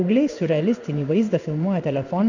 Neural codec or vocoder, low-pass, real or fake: codec, 16 kHz in and 24 kHz out, 1 kbps, XY-Tokenizer; 7.2 kHz; fake